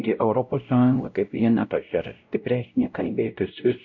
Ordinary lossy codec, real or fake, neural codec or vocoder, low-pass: AAC, 48 kbps; fake; codec, 16 kHz, 0.5 kbps, X-Codec, WavLM features, trained on Multilingual LibriSpeech; 7.2 kHz